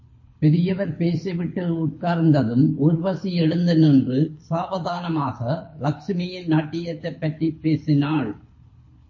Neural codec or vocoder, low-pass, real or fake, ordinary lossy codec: codec, 24 kHz, 6 kbps, HILCodec; 7.2 kHz; fake; MP3, 32 kbps